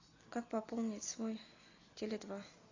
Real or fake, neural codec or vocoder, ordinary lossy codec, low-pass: real; none; AAC, 32 kbps; 7.2 kHz